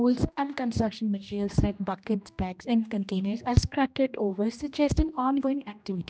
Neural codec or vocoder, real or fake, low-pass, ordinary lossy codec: codec, 16 kHz, 1 kbps, X-Codec, HuBERT features, trained on general audio; fake; none; none